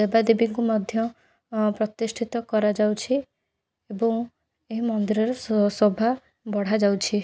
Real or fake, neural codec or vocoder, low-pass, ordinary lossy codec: real; none; none; none